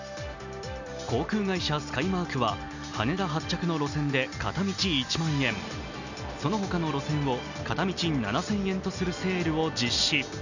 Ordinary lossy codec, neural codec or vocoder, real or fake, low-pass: none; none; real; 7.2 kHz